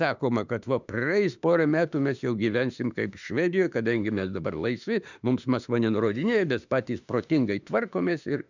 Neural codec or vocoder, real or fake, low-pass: autoencoder, 48 kHz, 32 numbers a frame, DAC-VAE, trained on Japanese speech; fake; 7.2 kHz